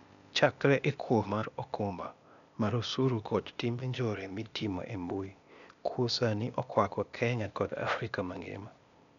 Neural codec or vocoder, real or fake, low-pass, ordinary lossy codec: codec, 16 kHz, 0.8 kbps, ZipCodec; fake; 7.2 kHz; none